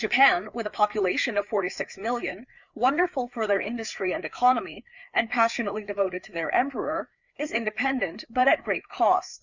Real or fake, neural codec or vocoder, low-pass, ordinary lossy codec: fake; codec, 16 kHz, 4 kbps, FreqCodec, larger model; 7.2 kHz; Opus, 64 kbps